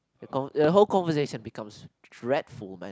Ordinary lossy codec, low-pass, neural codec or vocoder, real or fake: none; none; none; real